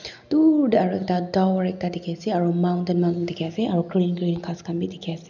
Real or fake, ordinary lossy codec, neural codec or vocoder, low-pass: real; none; none; 7.2 kHz